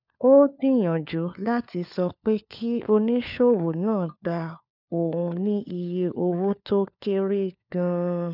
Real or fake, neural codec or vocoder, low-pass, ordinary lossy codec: fake; codec, 16 kHz, 4 kbps, FunCodec, trained on LibriTTS, 50 frames a second; 5.4 kHz; none